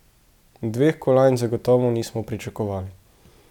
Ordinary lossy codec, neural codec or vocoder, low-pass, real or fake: none; none; 19.8 kHz; real